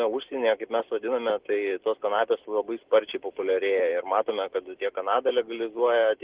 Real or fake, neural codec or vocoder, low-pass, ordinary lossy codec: real; none; 3.6 kHz; Opus, 16 kbps